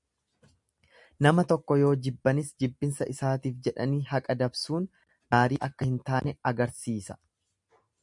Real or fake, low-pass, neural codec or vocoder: real; 10.8 kHz; none